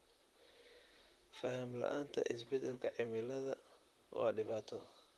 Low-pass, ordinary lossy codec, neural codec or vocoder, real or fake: 19.8 kHz; Opus, 32 kbps; vocoder, 44.1 kHz, 128 mel bands, Pupu-Vocoder; fake